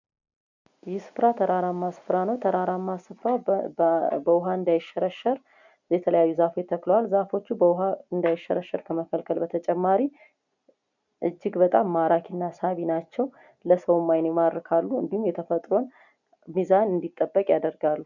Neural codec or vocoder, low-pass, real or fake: none; 7.2 kHz; real